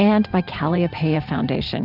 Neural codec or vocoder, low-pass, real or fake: vocoder, 44.1 kHz, 128 mel bands every 512 samples, BigVGAN v2; 5.4 kHz; fake